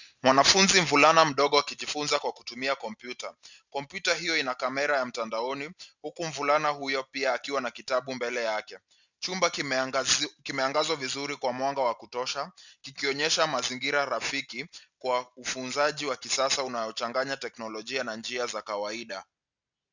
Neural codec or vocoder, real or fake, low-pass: none; real; 7.2 kHz